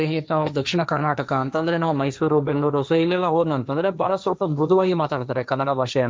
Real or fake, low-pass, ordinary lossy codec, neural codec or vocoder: fake; none; none; codec, 16 kHz, 1.1 kbps, Voila-Tokenizer